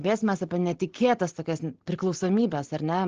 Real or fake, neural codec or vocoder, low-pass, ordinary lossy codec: real; none; 7.2 kHz; Opus, 16 kbps